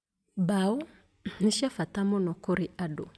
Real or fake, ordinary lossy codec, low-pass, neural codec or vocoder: real; none; none; none